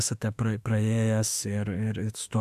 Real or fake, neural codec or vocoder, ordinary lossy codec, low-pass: fake; autoencoder, 48 kHz, 32 numbers a frame, DAC-VAE, trained on Japanese speech; AAC, 96 kbps; 14.4 kHz